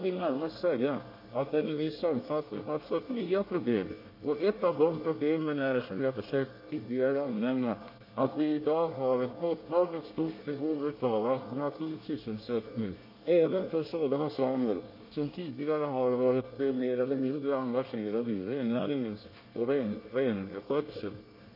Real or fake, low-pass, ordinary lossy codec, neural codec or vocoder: fake; 5.4 kHz; MP3, 32 kbps; codec, 24 kHz, 1 kbps, SNAC